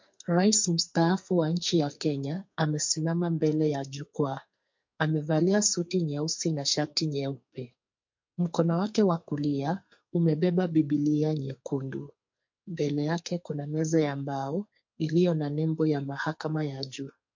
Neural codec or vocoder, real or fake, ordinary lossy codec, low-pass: codec, 44.1 kHz, 2.6 kbps, SNAC; fake; MP3, 48 kbps; 7.2 kHz